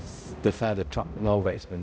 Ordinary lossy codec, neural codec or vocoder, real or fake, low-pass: none; codec, 16 kHz, 0.5 kbps, X-Codec, HuBERT features, trained on balanced general audio; fake; none